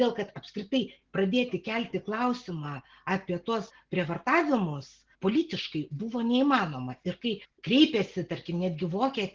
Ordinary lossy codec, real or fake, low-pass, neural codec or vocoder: Opus, 16 kbps; real; 7.2 kHz; none